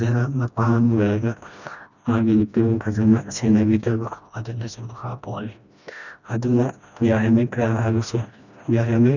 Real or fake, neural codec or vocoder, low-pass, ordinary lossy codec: fake; codec, 16 kHz, 1 kbps, FreqCodec, smaller model; 7.2 kHz; none